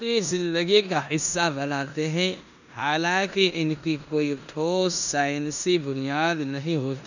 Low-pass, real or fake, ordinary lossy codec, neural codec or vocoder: 7.2 kHz; fake; none; codec, 16 kHz in and 24 kHz out, 0.9 kbps, LongCat-Audio-Codec, four codebook decoder